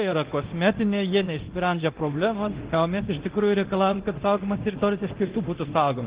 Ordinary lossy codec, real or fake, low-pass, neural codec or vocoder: Opus, 16 kbps; fake; 3.6 kHz; codec, 24 kHz, 0.9 kbps, DualCodec